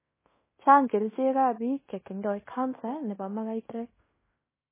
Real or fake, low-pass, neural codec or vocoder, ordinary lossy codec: fake; 3.6 kHz; codec, 16 kHz in and 24 kHz out, 0.9 kbps, LongCat-Audio-Codec, fine tuned four codebook decoder; MP3, 16 kbps